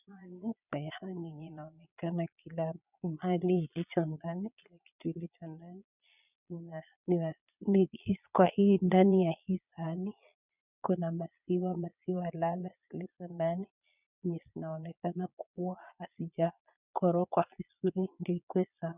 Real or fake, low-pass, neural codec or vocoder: fake; 3.6 kHz; vocoder, 22.05 kHz, 80 mel bands, Vocos